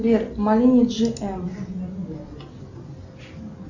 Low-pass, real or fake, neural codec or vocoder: 7.2 kHz; real; none